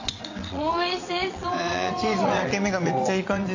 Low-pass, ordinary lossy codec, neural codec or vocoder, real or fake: 7.2 kHz; AAC, 48 kbps; vocoder, 22.05 kHz, 80 mel bands, WaveNeXt; fake